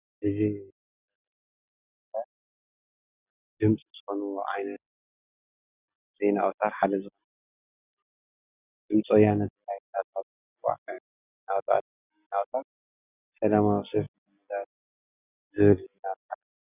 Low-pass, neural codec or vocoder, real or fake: 3.6 kHz; none; real